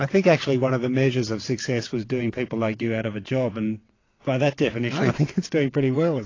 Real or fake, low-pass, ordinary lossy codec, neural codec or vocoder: fake; 7.2 kHz; AAC, 32 kbps; vocoder, 22.05 kHz, 80 mel bands, WaveNeXt